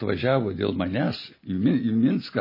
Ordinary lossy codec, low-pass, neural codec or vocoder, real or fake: MP3, 24 kbps; 5.4 kHz; none; real